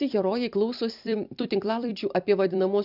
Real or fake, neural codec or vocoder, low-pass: fake; vocoder, 44.1 kHz, 128 mel bands every 256 samples, BigVGAN v2; 5.4 kHz